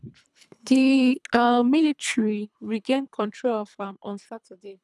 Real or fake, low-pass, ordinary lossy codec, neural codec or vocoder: fake; none; none; codec, 24 kHz, 3 kbps, HILCodec